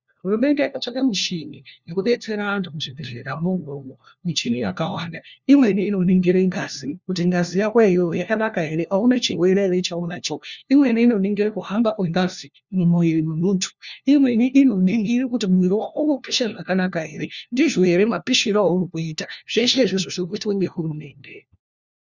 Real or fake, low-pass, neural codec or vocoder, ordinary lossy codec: fake; 7.2 kHz; codec, 16 kHz, 1 kbps, FunCodec, trained on LibriTTS, 50 frames a second; Opus, 64 kbps